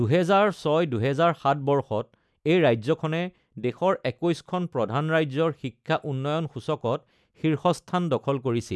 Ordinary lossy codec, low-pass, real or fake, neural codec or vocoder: none; none; real; none